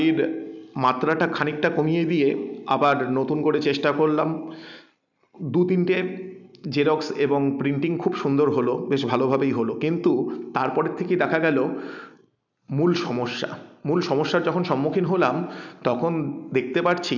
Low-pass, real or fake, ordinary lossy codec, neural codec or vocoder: 7.2 kHz; real; none; none